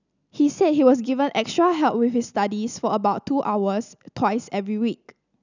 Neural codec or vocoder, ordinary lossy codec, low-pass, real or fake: none; none; 7.2 kHz; real